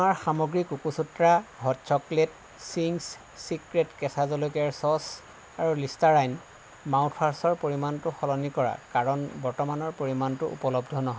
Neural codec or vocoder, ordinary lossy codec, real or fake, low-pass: none; none; real; none